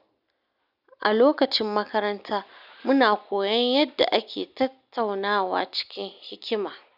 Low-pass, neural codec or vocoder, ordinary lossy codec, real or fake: 5.4 kHz; none; none; real